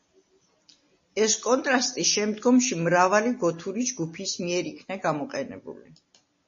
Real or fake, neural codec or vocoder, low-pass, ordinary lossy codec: real; none; 7.2 kHz; MP3, 32 kbps